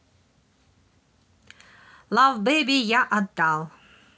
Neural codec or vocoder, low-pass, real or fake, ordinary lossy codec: none; none; real; none